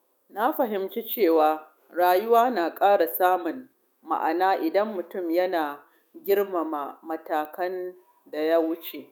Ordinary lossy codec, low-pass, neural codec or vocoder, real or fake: none; 19.8 kHz; autoencoder, 48 kHz, 128 numbers a frame, DAC-VAE, trained on Japanese speech; fake